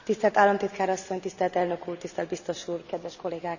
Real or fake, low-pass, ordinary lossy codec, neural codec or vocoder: real; 7.2 kHz; none; none